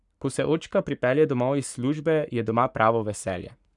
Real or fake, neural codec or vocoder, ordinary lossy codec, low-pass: fake; codec, 44.1 kHz, 7.8 kbps, Pupu-Codec; none; 10.8 kHz